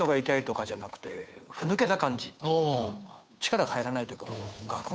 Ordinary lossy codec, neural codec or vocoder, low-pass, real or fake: none; codec, 16 kHz, 2 kbps, FunCodec, trained on Chinese and English, 25 frames a second; none; fake